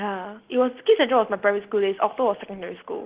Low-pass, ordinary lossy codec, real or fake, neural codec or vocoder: 3.6 kHz; Opus, 16 kbps; real; none